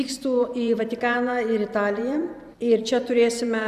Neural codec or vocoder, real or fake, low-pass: vocoder, 44.1 kHz, 128 mel bands every 512 samples, BigVGAN v2; fake; 14.4 kHz